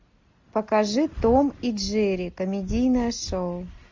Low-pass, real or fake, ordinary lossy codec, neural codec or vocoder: 7.2 kHz; real; MP3, 48 kbps; none